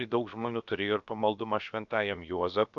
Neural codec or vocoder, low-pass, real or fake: codec, 16 kHz, about 1 kbps, DyCAST, with the encoder's durations; 7.2 kHz; fake